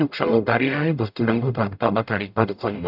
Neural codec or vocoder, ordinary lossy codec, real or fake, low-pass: codec, 44.1 kHz, 0.9 kbps, DAC; none; fake; 5.4 kHz